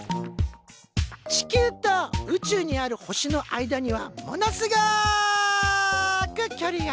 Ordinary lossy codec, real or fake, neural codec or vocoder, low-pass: none; real; none; none